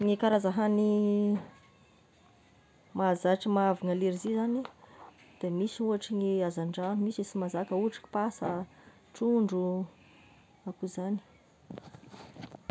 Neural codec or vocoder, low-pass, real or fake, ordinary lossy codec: none; none; real; none